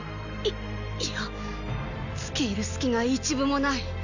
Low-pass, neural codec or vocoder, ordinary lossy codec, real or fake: 7.2 kHz; none; none; real